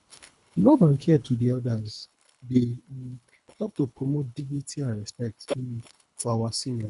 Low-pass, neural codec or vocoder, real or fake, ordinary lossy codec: 10.8 kHz; codec, 24 kHz, 3 kbps, HILCodec; fake; none